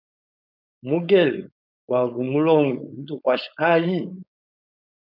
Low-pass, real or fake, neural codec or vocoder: 5.4 kHz; fake; codec, 16 kHz, 4.8 kbps, FACodec